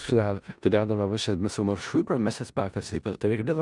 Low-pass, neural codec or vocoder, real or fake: 10.8 kHz; codec, 16 kHz in and 24 kHz out, 0.4 kbps, LongCat-Audio-Codec, four codebook decoder; fake